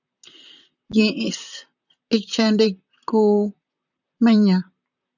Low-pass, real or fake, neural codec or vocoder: 7.2 kHz; fake; vocoder, 44.1 kHz, 128 mel bands, Pupu-Vocoder